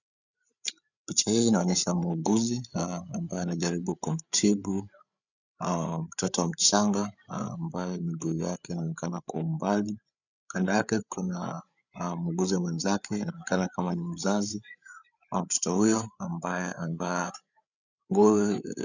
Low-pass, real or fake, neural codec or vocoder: 7.2 kHz; fake; codec, 16 kHz, 8 kbps, FreqCodec, larger model